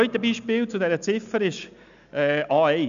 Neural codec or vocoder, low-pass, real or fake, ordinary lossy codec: none; 7.2 kHz; real; none